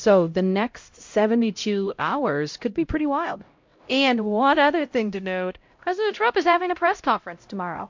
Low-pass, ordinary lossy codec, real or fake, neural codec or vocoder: 7.2 kHz; MP3, 48 kbps; fake; codec, 16 kHz, 0.5 kbps, X-Codec, HuBERT features, trained on LibriSpeech